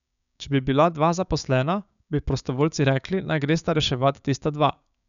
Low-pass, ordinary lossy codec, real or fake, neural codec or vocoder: 7.2 kHz; none; fake; codec, 16 kHz, 6 kbps, DAC